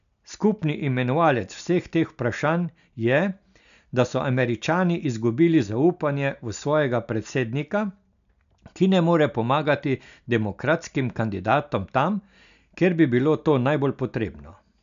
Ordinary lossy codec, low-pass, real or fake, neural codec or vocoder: none; 7.2 kHz; real; none